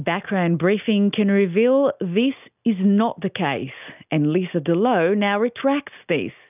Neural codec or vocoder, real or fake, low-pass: none; real; 3.6 kHz